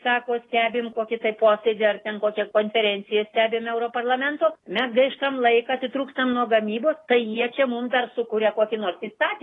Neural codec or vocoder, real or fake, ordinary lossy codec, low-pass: none; real; AAC, 32 kbps; 10.8 kHz